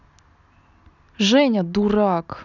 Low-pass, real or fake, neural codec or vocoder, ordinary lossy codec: 7.2 kHz; real; none; none